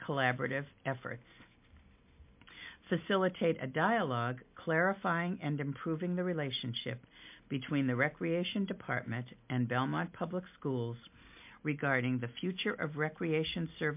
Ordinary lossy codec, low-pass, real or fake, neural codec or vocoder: MP3, 32 kbps; 3.6 kHz; real; none